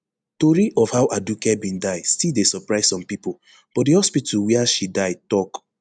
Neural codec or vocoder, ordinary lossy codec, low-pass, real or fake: none; none; 9.9 kHz; real